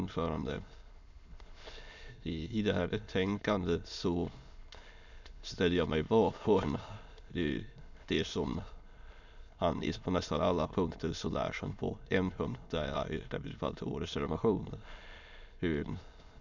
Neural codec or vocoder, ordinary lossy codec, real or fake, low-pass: autoencoder, 22.05 kHz, a latent of 192 numbers a frame, VITS, trained on many speakers; none; fake; 7.2 kHz